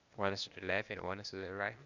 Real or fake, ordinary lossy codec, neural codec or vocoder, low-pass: fake; none; codec, 16 kHz, 0.8 kbps, ZipCodec; 7.2 kHz